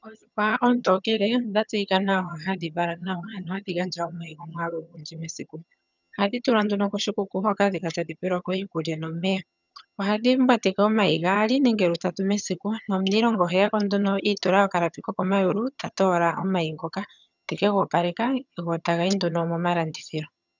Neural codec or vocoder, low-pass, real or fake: vocoder, 22.05 kHz, 80 mel bands, HiFi-GAN; 7.2 kHz; fake